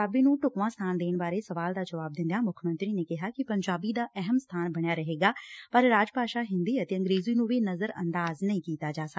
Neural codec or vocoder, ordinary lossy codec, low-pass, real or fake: none; none; none; real